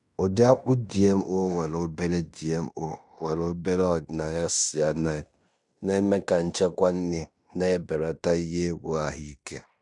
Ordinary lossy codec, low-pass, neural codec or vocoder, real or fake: none; 10.8 kHz; codec, 16 kHz in and 24 kHz out, 0.9 kbps, LongCat-Audio-Codec, fine tuned four codebook decoder; fake